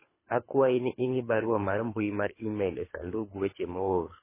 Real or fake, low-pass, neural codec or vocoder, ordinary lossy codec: fake; 3.6 kHz; codec, 24 kHz, 3 kbps, HILCodec; MP3, 16 kbps